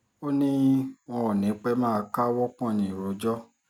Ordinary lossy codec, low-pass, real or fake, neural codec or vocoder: none; 19.8 kHz; real; none